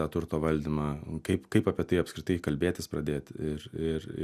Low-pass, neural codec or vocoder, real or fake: 14.4 kHz; none; real